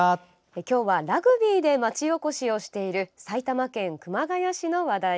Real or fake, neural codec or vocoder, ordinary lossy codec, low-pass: real; none; none; none